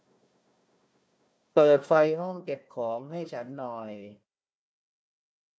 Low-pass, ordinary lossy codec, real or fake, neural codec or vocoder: none; none; fake; codec, 16 kHz, 1 kbps, FunCodec, trained on Chinese and English, 50 frames a second